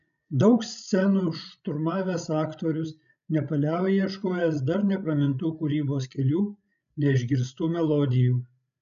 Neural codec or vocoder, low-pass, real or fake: codec, 16 kHz, 16 kbps, FreqCodec, larger model; 7.2 kHz; fake